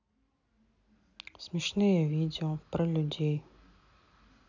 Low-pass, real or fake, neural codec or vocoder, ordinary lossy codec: 7.2 kHz; real; none; none